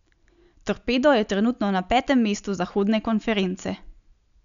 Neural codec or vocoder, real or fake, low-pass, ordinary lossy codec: none; real; 7.2 kHz; none